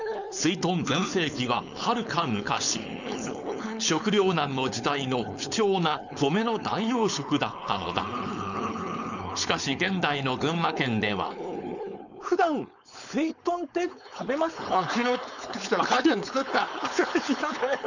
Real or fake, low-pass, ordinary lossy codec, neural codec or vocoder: fake; 7.2 kHz; none; codec, 16 kHz, 4.8 kbps, FACodec